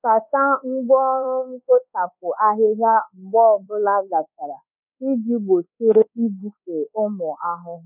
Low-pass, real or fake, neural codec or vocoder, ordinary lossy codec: 3.6 kHz; fake; codec, 24 kHz, 1.2 kbps, DualCodec; none